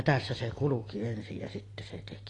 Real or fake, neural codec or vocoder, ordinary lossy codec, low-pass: real; none; AAC, 32 kbps; 10.8 kHz